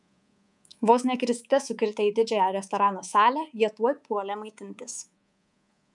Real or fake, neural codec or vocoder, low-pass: fake; codec, 24 kHz, 3.1 kbps, DualCodec; 10.8 kHz